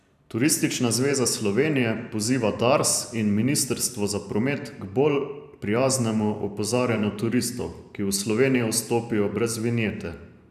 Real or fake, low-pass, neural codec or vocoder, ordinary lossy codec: fake; 14.4 kHz; vocoder, 44.1 kHz, 128 mel bands every 512 samples, BigVGAN v2; none